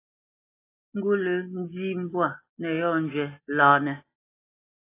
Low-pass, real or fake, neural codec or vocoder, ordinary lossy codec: 3.6 kHz; real; none; AAC, 24 kbps